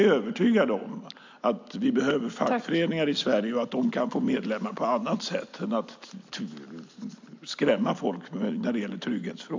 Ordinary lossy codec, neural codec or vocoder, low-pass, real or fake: MP3, 64 kbps; none; 7.2 kHz; real